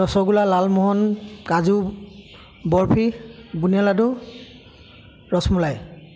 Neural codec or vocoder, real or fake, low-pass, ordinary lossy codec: none; real; none; none